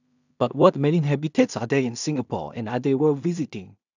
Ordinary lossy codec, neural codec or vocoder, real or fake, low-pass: none; codec, 16 kHz in and 24 kHz out, 0.4 kbps, LongCat-Audio-Codec, two codebook decoder; fake; 7.2 kHz